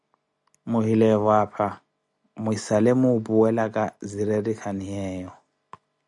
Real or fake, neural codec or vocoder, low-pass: real; none; 10.8 kHz